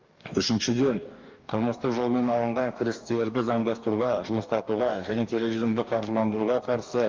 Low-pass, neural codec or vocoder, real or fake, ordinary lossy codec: 7.2 kHz; codec, 44.1 kHz, 2.6 kbps, DAC; fake; Opus, 32 kbps